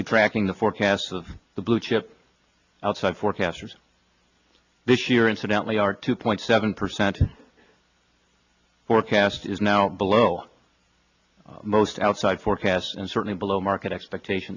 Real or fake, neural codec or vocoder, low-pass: real; none; 7.2 kHz